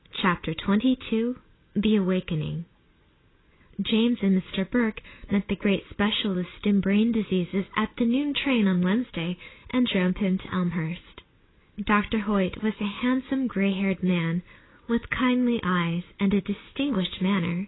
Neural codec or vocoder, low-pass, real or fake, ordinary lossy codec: none; 7.2 kHz; real; AAC, 16 kbps